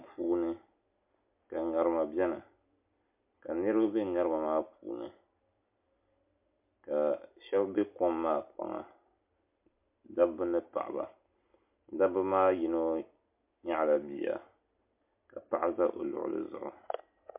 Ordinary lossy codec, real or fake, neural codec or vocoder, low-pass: MP3, 32 kbps; real; none; 3.6 kHz